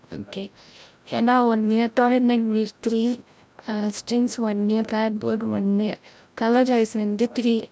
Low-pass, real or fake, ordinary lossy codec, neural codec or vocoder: none; fake; none; codec, 16 kHz, 0.5 kbps, FreqCodec, larger model